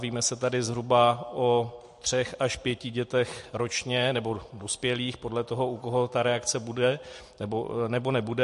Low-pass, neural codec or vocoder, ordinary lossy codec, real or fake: 14.4 kHz; none; MP3, 48 kbps; real